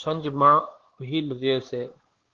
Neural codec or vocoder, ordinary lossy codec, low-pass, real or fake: codec, 16 kHz, 2 kbps, X-Codec, WavLM features, trained on Multilingual LibriSpeech; Opus, 16 kbps; 7.2 kHz; fake